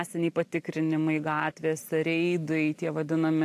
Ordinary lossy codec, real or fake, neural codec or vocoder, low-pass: AAC, 64 kbps; real; none; 14.4 kHz